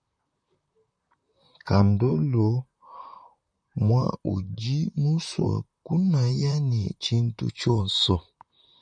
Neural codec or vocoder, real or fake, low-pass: vocoder, 44.1 kHz, 128 mel bands, Pupu-Vocoder; fake; 9.9 kHz